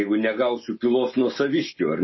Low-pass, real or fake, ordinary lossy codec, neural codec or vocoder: 7.2 kHz; real; MP3, 24 kbps; none